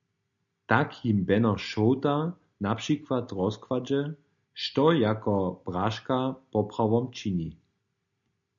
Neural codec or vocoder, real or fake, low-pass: none; real; 7.2 kHz